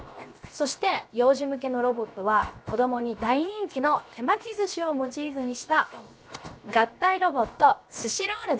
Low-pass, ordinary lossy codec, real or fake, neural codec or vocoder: none; none; fake; codec, 16 kHz, 0.7 kbps, FocalCodec